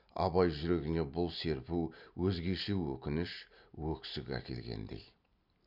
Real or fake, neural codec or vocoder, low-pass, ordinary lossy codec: real; none; 5.4 kHz; none